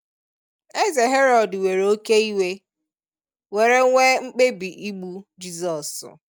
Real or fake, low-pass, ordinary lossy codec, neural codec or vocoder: real; none; none; none